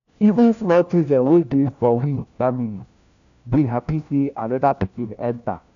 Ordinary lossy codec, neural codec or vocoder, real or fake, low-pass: none; codec, 16 kHz, 1 kbps, FunCodec, trained on LibriTTS, 50 frames a second; fake; 7.2 kHz